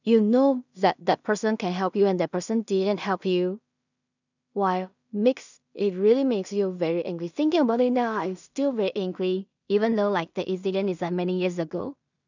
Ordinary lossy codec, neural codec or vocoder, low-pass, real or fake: none; codec, 16 kHz in and 24 kHz out, 0.4 kbps, LongCat-Audio-Codec, two codebook decoder; 7.2 kHz; fake